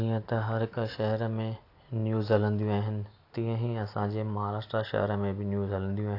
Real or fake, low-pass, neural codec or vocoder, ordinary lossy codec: real; 5.4 kHz; none; AAC, 32 kbps